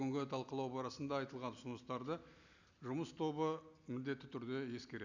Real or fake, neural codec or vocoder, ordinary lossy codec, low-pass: real; none; none; 7.2 kHz